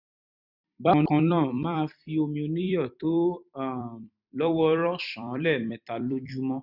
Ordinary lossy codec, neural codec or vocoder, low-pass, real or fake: none; vocoder, 44.1 kHz, 128 mel bands every 256 samples, BigVGAN v2; 5.4 kHz; fake